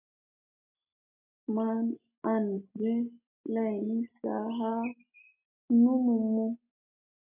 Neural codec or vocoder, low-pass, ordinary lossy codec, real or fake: none; 3.6 kHz; AAC, 32 kbps; real